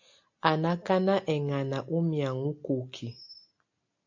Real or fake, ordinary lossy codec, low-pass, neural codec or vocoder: real; AAC, 48 kbps; 7.2 kHz; none